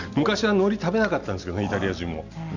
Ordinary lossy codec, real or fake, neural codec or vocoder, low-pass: none; real; none; 7.2 kHz